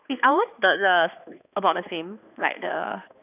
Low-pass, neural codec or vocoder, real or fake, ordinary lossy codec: 3.6 kHz; codec, 16 kHz, 2 kbps, X-Codec, HuBERT features, trained on balanced general audio; fake; none